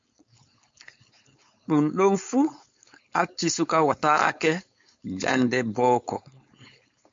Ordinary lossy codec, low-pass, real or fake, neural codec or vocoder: MP3, 48 kbps; 7.2 kHz; fake; codec, 16 kHz, 4.8 kbps, FACodec